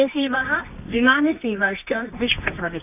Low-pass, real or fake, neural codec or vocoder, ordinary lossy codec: 3.6 kHz; fake; codec, 24 kHz, 0.9 kbps, WavTokenizer, medium music audio release; none